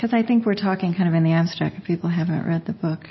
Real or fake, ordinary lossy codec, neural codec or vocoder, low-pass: real; MP3, 24 kbps; none; 7.2 kHz